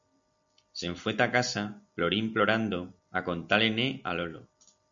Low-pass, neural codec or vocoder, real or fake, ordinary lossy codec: 7.2 kHz; none; real; MP3, 64 kbps